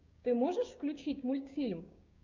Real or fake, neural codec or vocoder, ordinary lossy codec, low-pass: fake; codec, 16 kHz, 6 kbps, DAC; MP3, 64 kbps; 7.2 kHz